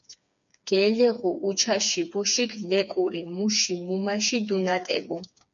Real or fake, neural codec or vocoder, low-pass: fake; codec, 16 kHz, 4 kbps, FreqCodec, smaller model; 7.2 kHz